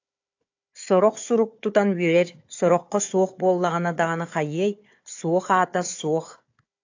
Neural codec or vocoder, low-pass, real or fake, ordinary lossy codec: codec, 16 kHz, 4 kbps, FunCodec, trained on Chinese and English, 50 frames a second; 7.2 kHz; fake; AAC, 48 kbps